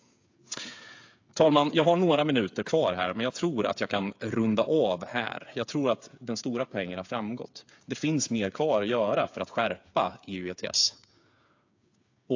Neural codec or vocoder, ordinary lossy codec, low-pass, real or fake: codec, 16 kHz, 8 kbps, FreqCodec, smaller model; AAC, 48 kbps; 7.2 kHz; fake